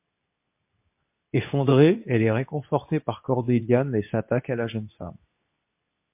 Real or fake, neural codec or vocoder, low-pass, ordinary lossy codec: fake; codec, 24 kHz, 0.9 kbps, WavTokenizer, medium speech release version 2; 3.6 kHz; MP3, 32 kbps